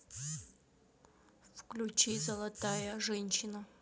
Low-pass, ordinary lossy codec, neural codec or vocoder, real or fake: none; none; none; real